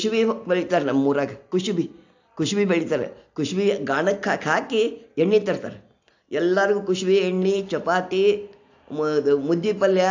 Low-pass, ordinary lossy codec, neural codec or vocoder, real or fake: 7.2 kHz; AAC, 48 kbps; none; real